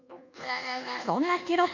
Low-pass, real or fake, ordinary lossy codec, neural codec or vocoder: 7.2 kHz; fake; none; codec, 24 kHz, 1.2 kbps, DualCodec